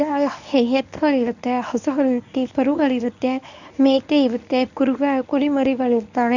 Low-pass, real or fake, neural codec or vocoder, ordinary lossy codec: 7.2 kHz; fake; codec, 24 kHz, 0.9 kbps, WavTokenizer, medium speech release version 1; none